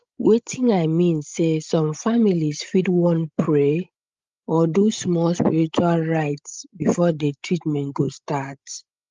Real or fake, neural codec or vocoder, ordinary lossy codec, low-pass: fake; codec, 16 kHz, 16 kbps, FreqCodec, larger model; Opus, 32 kbps; 7.2 kHz